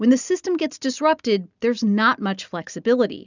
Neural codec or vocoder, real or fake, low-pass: none; real; 7.2 kHz